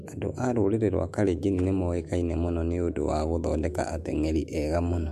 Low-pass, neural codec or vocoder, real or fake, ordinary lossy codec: 14.4 kHz; autoencoder, 48 kHz, 128 numbers a frame, DAC-VAE, trained on Japanese speech; fake; MP3, 64 kbps